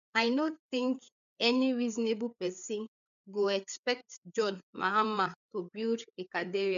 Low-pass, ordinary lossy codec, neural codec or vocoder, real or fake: 7.2 kHz; none; codec, 16 kHz, 4 kbps, FreqCodec, larger model; fake